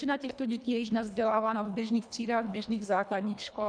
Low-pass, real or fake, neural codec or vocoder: 9.9 kHz; fake; codec, 24 kHz, 1.5 kbps, HILCodec